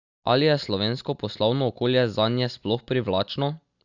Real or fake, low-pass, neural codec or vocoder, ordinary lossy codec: real; 7.2 kHz; none; Opus, 64 kbps